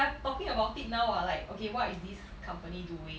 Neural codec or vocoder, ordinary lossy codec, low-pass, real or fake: none; none; none; real